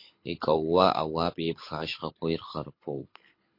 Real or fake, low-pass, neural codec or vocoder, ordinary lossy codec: fake; 5.4 kHz; codec, 24 kHz, 6 kbps, HILCodec; MP3, 32 kbps